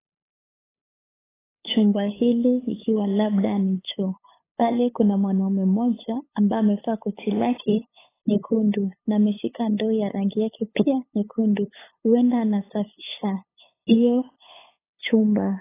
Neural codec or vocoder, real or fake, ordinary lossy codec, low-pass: codec, 16 kHz, 8 kbps, FunCodec, trained on LibriTTS, 25 frames a second; fake; AAC, 16 kbps; 3.6 kHz